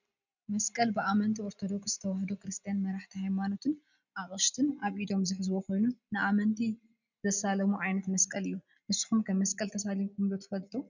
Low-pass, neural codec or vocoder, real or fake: 7.2 kHz; none; real